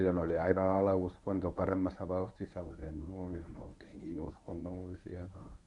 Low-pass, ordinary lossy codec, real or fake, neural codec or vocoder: 10.8 kHz; none; fake; codec, 24 kHz, 0.9 kbps, WavTokenizer, medium speech release version 1